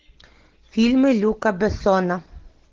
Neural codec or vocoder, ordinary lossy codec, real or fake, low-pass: none; Opus, 16 kbps; real; 7.2 kHz